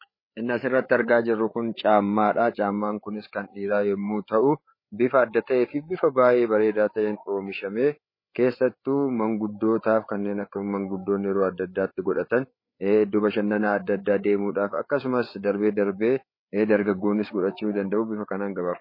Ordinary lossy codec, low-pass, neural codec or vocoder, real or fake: MP3, 24 kbps; 5.4 kHz; codec, 16 kHz, 8 kbps, FreqCodec, larger model; fake